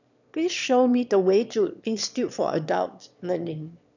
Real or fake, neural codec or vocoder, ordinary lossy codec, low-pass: fake; autoencoder, 22.05 kHz, a latent of 192 numbers a frame, VITS, trained on one speaker; none; 7.2 kHz